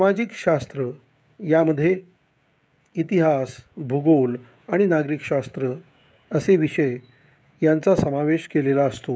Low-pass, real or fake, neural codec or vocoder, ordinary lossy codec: none; fake; codec, 16 kHz, 16 kbps, FreqCodec, smaller model; none